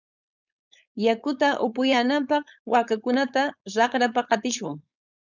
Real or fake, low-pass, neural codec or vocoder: fake; 7.2 kHz; codec, 16 kHz, 4.8 kbps, FACodec